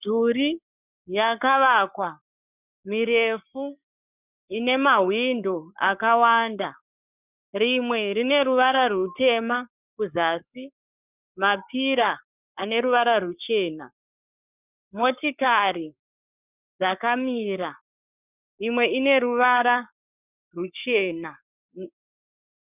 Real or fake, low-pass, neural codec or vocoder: fake; 3.6 kHz; codec, 44.1 kHz, 7.8 kbps, Pupu-Codec